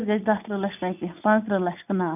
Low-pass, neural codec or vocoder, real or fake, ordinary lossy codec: 3.6 kHz; none; real; none